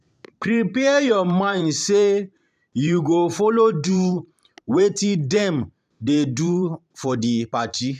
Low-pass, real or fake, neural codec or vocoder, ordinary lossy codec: 14.4 kHz; fake; vocoder, 44.1 kHz, 128 mel bands every 512 samples, BigVGAN v2; none